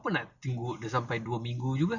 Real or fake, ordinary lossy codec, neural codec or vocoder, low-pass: real; none; none; 7.2 kHz